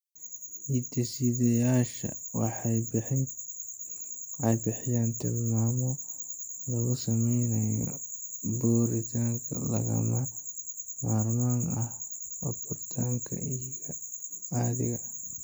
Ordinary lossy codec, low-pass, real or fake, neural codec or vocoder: none; none; real; none